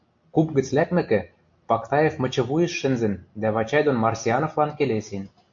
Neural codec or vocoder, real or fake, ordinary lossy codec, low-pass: none; real; MP3, 48 kbps; 7.2 kHz